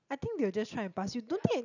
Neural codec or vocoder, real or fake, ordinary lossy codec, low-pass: none; real; none; 7.2 kHz